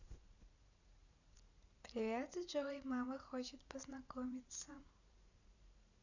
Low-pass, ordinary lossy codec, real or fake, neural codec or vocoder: 7.2 kHz; none; fake; vocoder, 44.1 kHz, 80 mel bands, Vocos